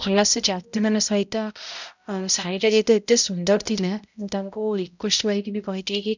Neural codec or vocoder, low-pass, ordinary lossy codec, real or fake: codec, 16 kHz, 0.5 kbps, X-Codec, HuBERT features, trained on balanced general audio; 7.2 kHz; none; fake